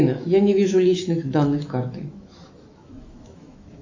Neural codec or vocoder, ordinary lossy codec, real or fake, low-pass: autoencoder, 48 kHz, 128 numbers a frame, DAC-VAE, trained on Japanese speech; AAC, 48 kbps; fake; 7.2 kHz